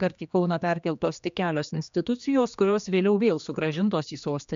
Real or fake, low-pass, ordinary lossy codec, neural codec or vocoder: fake; 7.2 kHz; MP3, 64 kbps; codec, 16 kHz, 2 kbps, X-Codec, HuBERT features, trained on general audio